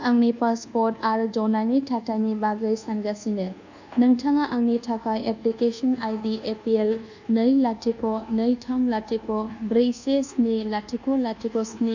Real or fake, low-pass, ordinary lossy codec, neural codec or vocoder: fake; 7.2 kHz; none; codec, 24 kHz, 1.2 kbps, DualCodec